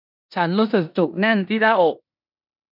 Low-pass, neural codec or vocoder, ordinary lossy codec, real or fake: 5.4 kHz; codec, 16 kHz in and 24 kHz out, 0.9 kbps, LongCat-Audio-Codec, four codebook decoder; none; fake